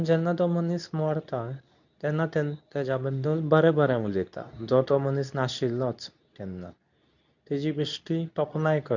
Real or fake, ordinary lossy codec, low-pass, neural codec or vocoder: fake; none; 7.2 kHz; codec, 24 kHz, 0.9 kbps, WavTokenizer, medium speech release version 2